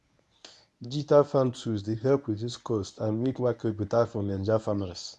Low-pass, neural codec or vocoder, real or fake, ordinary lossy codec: none; codec, 24 kHz, 0.9 kbps, WavTokenizer, medium speech release version 1; fake; none